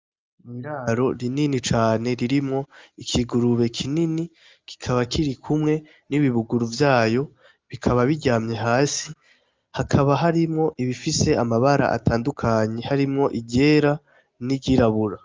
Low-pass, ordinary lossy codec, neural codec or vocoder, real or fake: 7.2 kHz; Opus, 32 kbps; none; real